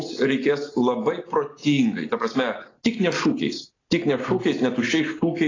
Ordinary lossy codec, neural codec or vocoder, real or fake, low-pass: AAC, 32 kbps; none; real; 7.2 kHz